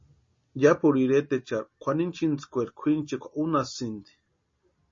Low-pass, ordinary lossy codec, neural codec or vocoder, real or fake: 7.2 kHz; MP3, 32 kbps; none; real